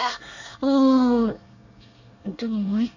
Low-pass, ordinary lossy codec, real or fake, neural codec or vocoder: 7.2 kHz; none; fake; codec, 24 kHz, 1 kbps, SNAC